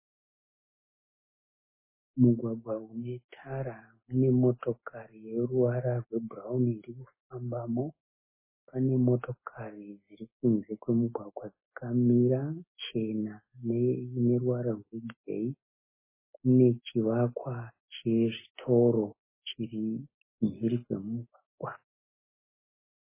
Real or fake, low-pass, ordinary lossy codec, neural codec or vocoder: real; 3.6 kHz; MP3, 16 kbps; none